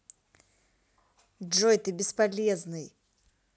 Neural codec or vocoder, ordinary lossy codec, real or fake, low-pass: none; none; real; none